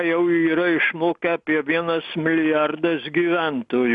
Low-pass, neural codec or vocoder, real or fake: 10.8 kHz; none; real